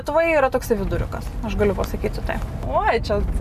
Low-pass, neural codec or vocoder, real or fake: 14.4 kHz; none; real